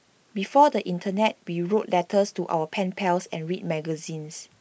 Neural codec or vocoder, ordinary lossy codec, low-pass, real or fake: none; none; none; real